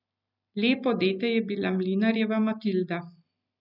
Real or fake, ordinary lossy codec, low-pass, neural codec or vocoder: real; none; 5.4 kHz; none